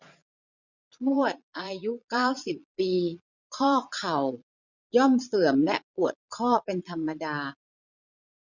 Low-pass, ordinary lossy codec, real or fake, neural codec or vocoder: 7.2 kHz; none; fake; vocoder, 22.05 kHz, 80 mel bands, Vocos